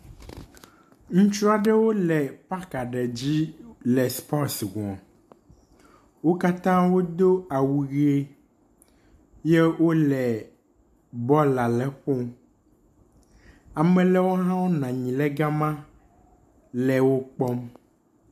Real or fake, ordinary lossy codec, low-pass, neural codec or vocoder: real; MP3, 96 kbps; 14.4 kHz; none